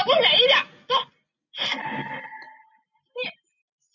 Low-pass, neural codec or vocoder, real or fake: 7.2 kHz; none; real